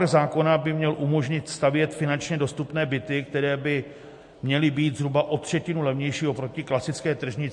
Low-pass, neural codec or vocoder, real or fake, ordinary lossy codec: 10.8 kHz; none; real; MP3, 48 kbps